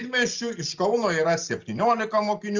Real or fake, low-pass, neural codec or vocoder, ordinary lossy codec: real; 7.2 kHz; none; Opus, 32 kbps